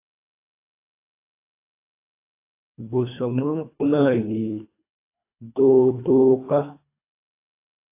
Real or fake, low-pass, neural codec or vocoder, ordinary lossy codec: fake; 3.6 kHz; codec, 24 kHz, 1.5 kbps, HILCodec; AAC, 24 kbps